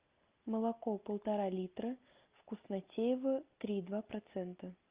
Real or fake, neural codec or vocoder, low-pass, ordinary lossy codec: real; none; 3.6 kHz; Opus, 24 kbps